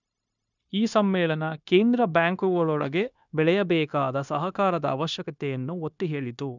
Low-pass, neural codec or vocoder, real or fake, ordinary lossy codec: 7.2 kHz; codec, 16 kHz, 0.9 kbps, LongCat-Audio-Codec; fake; none